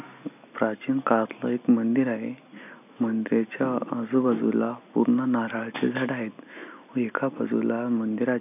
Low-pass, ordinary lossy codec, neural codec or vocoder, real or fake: 3.6 kHz; none; none; real